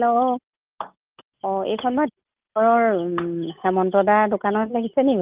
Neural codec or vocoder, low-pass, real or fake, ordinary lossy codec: none; 3.6 kHz; real; Opus, 32 kbps